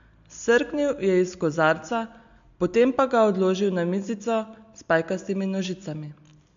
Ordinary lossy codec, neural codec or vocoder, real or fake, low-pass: MP3, 64 kbps; none; real; 7.2 kHz